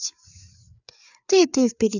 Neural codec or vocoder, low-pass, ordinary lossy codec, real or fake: codec, 16 kHz, 4 kbps, FreqCodec, larger model; 7.2 kHz; none; fake